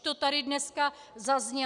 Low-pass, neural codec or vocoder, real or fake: 10.8 kHz; none; real